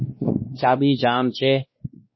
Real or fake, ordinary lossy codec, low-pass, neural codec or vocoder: fake; MP3, 24 kbps; 7.2 kHz; codec, 16 kHz, 1 kbps, X-Codec, WavLM features, trained on Multilingual LibriSpeech